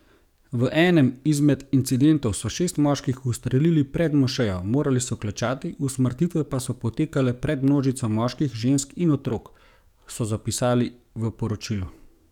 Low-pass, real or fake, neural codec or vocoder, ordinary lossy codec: 19.8 kHz; fake; codec, 44.1 kHz, 7.8 kbps, DAC; none